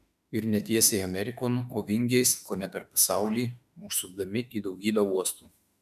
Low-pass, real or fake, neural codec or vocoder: 14.4 kHz; fake; autoencoder, 48 kHz, 32 numbers a frame, DAC-VAE, trained on Japanese speech